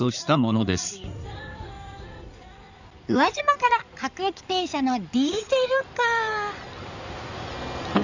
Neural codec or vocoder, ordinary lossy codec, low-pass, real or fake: codec, 16 kHz in and 24 kHz out, 2.2 kbps, FireRedTTS-2 codec; none; 7.2 kHz; fake